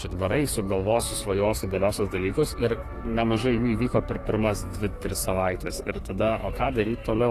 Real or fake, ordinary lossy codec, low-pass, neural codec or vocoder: fake; AAC, 48 kbps; 14.4 kHz; codec, 32 kHz, 1.9 kbps, SNAC